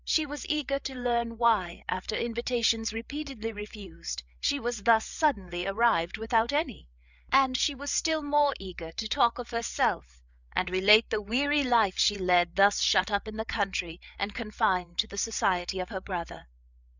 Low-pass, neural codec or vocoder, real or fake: 7.2 kHz; codec, 16 kHz, 8 kbps, FreqCodec, larger model; fake